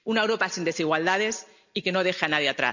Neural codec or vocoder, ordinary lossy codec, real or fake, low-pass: none; none; real; 7.2 kHz